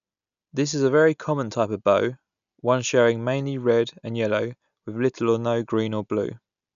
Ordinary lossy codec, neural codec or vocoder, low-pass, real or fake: none; none; 7.2 kHz; real